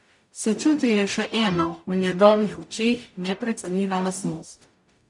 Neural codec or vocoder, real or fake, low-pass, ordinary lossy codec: codec, 44.1 kHz, 0.9 kbps, DAC; fake; 10.8 kHz; none